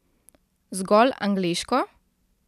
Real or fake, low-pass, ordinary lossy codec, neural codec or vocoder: real; 14.4 kHz; none; none